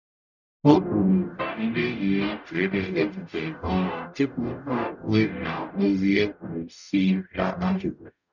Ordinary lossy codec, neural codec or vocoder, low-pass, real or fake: none; codec, 44.1 kHz, 0.9 kbps, DAC; 7.2 kHz; fake